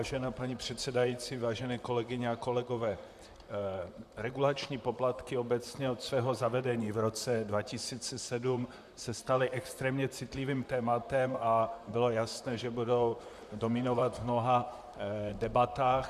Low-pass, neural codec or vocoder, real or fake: 14.4 kHz; vocoder, 44.1 kHz, 128 mel bands, Pupu-Vocoder; fake